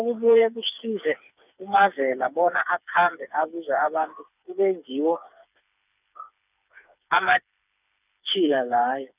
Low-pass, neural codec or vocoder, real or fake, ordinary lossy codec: 3.6 kHz; codec, 16 kHz, 4 kbps, FreqCodec, smaller model; fake; none